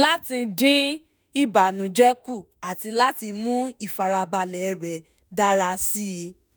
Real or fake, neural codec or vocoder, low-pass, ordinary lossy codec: fake; autoencoder, 48 kHz, 32 numbers a frame, DAC-VAE, trained on Japanese speech; none; none